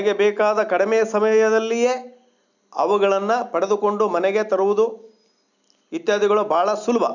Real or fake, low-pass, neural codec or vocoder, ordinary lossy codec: real; 7.2 kHz; none; none